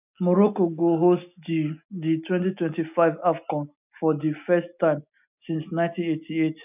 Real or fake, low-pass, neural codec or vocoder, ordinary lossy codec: real; 3.6 kHz; none; none